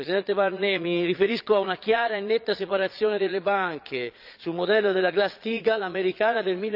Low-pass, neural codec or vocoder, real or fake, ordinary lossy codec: 5.4 kHz; vocoder, 22.05 kHz, 80 mel bands, Vocos; fake; none